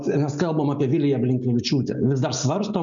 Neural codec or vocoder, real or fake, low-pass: none; real; 7.2 kHz